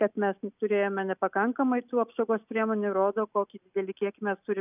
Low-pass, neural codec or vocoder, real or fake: 3.6 kHz; none; real